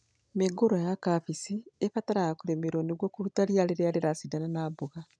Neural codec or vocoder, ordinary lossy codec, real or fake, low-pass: none; none; real; 9.9 kHz